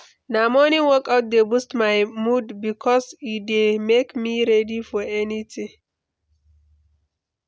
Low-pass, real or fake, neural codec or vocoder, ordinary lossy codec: none; real; none; none